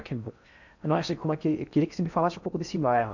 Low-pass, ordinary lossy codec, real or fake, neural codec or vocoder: 7.2 kHz; none; fake; codec, 16 kHz in and 24 kHz out, 0.6 kbps, FocalCodec, streaming, 4096 codes